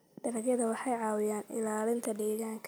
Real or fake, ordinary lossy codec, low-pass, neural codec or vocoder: real; none; none; none